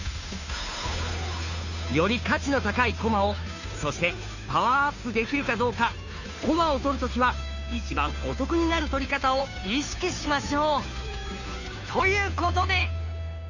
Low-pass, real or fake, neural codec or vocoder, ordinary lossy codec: 7.2 kHz; fake; codec, 16 kHz, 2 kbps, FunCodec, trained on Chinese and English, 25 frames a second; none